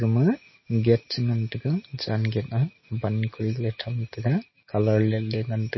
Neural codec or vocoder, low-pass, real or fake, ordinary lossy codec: none; 7.2 kHz; real; MP3, 24 kbps